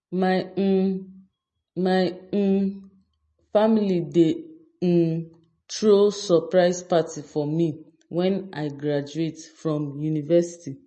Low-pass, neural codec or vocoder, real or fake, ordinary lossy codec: 10.8 kHz; none; real; MP3, 32 kbps